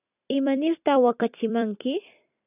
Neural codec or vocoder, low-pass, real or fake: vocoder, 44.1 kHz, 128 mel bands every 256 samples, BigVGAN v2; 3.6 kHz; fake